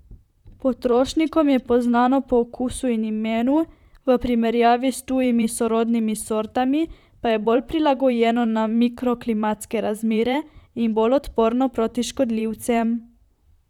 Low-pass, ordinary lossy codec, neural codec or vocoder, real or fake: 19.8 kHz; none; vocoder, 44.1 kHz, 128 mel bands, Pupu-Vocoder; fake